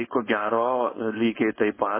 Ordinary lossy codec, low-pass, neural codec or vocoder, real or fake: MP3, 16 kbps; 3.6 kHz; codec, 16 kHz, 4.8 kbps, FACodec; fake